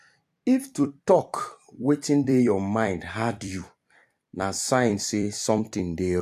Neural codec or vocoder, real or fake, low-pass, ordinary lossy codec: vocoder, 44.1 kHz, 128 mel bands every 256 samples, BigVGAN v2; fake; 14.4 kHz; AAC, 96 kbps